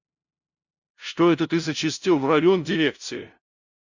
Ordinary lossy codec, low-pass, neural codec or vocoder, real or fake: Opus, 64 kbps; 7.2 kHz; codec, 16 kHz, 0.5 kbps, FunCodec, trained on LibriTTS, 25 frames a second; fake